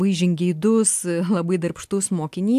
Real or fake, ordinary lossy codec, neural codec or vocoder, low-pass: real; AAC, 96 kbps; none; 14.4 kHz